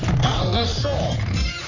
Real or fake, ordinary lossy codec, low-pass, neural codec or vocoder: fake; none; 7.2 kHz; codec, 44.1 kHz, 3.4 kbps, Pupu-Codec